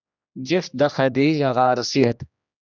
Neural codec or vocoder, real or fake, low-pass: codec, 16 kHz, 1 kbps, X-Codec, HuBERT features, trained on general audio; fake; 7.2 kHz